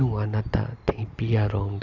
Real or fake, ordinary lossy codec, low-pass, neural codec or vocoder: real; none; 7.2 kHz; none